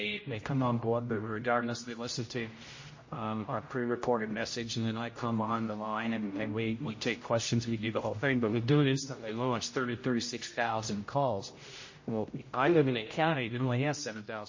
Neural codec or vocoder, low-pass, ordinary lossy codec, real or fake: codec, 16 kHz, 0.5 kbps, X-Codec, HuBERT features, trained on general audio; 7.2 kHz; MP3, 32 kbps; fake